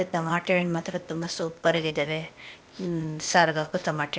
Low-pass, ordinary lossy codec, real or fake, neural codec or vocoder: none; none; fake; codec, 16 kHz, 0.8 kbps, ZipCodec